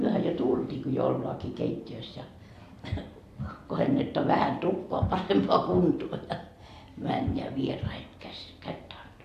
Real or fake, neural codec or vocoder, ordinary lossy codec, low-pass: fake; vocoder, 44.1 kHz, 128 mel bands every 256 samples, BigVGAN v2; AAC, 64 kbps; 14.4 kHz